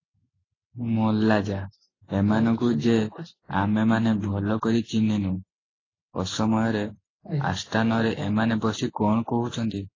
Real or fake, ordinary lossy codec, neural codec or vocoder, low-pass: real; AAC, 32 kbps; none; 7.2 kHz